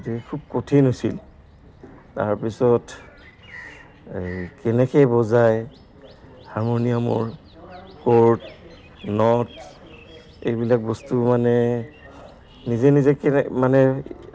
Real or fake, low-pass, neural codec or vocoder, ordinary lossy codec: real; none; none; none